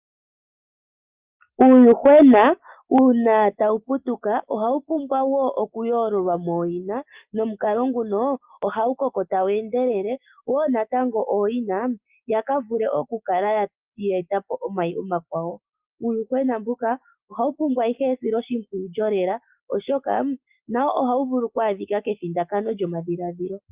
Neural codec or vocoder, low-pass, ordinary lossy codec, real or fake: none; 3.6 kHz; Opus, 24 kbps; real